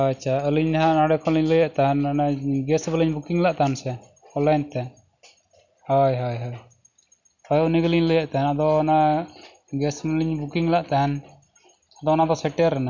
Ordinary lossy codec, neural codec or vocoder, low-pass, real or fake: none; none; 7.2 kHz; real